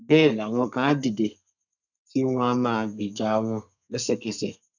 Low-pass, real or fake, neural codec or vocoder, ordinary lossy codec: 7.2 kHz; fake; codec, 32 kHz, 1.9 kbps, SNAC; none